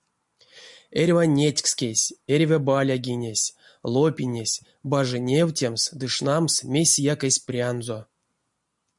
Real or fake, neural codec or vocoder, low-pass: real; none; 10.8 kHz